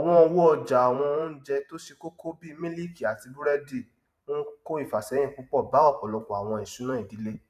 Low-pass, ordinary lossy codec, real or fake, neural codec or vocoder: 14.4 kHz; none; fake; vocoder, 44.1 kHz, 128 mel bands every 512 samples, BigVGAN v2